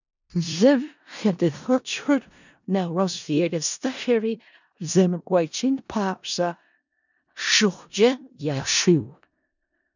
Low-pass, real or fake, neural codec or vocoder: 7.2 kHz; fake; codec, 16 kHz in and 24 kHz out, 0.4 kbps, LongCat-Audio-Codec, four codebook decoder